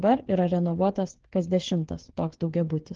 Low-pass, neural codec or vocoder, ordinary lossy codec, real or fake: 7.2 kHz; codec, 16 kHz, 8 kbps, FreqCodec, smaller model; Opus, 16 kbps; fake